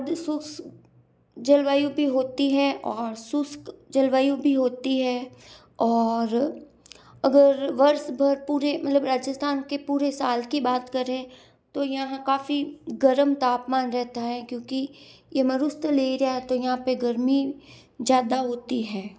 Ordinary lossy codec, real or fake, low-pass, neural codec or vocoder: none; real; none; none